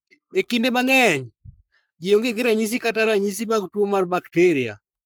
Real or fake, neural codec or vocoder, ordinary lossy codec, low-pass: fake; codec, 44.1 kHz, 3.4 kbps, Pupu-Codec; none; none